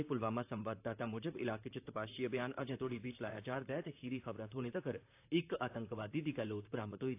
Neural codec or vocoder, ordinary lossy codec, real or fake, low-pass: vocoder, 44.1 kHz, 128 mel bands, Pupu-Vocoder; AAC, 24 kbps; fake; 3.6 kHz